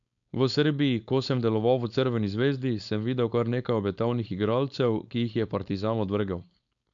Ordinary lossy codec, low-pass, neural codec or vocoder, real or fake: none; 7.2 kHz; codec, 16 kHz, 4.8 kbps, FACodec; fake